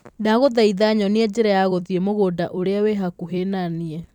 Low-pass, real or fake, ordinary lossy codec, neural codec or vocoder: 19.8 kHz; fake; none; vocoder, 44.1 kHz, 128 mel bands every 256 samples, BigVGAN v2